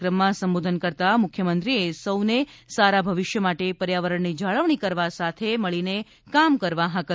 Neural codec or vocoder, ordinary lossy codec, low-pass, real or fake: none; none; none; real